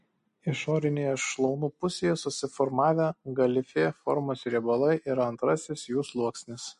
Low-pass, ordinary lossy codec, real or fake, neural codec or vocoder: 14.4 kHz; MP3, 48 kbps; real; none